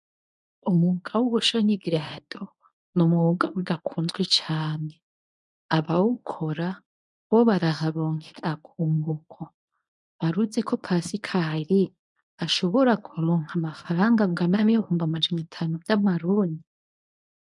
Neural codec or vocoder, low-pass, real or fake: codec, 24 kHz, 0.9 kbps, WavTokenizer, medium speech release version 1; 10.8 kHz; fake